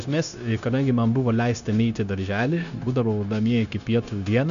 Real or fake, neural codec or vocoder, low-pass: fake; codec, 16 kHz, 0.9 kbps, LongCat-Audio-Codec; 7.2 kHz